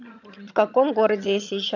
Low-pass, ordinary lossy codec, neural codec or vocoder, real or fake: 7.2 kHz; none; vocoder, 22.05 kHz, 80 mel bands, HiFi-GAN; fake